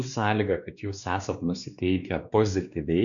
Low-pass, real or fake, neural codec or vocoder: 7.2 kHz; fake; codec, 16 kHz, 2 kbps, X-Codec, WavLM features, trained on Multilingual LibriSpeech